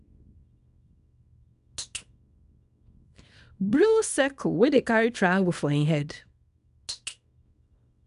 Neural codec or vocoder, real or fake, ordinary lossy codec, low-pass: codec, 24 kHz, 0.9 kbps, WavTokenizer, small release; fake; none; 10.8 kHz